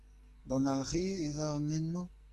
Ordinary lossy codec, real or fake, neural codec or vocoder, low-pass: Opus, 32 kbps; fake; codec, 44.1 kHz, 2.6 kbps, SNAC; 14.4 kHz